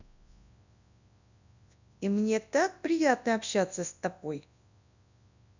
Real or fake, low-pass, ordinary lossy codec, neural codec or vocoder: fake; 7.2 kHz; none; codec, 24 kHz, 0.9 kbps, WavTokenizer, large speech release